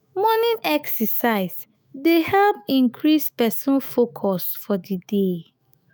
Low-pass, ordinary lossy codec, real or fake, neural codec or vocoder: none; none; fake; autoencoder, 48 kHz, 128 numbers a frame, DAC-VAE, trained on Japanese speech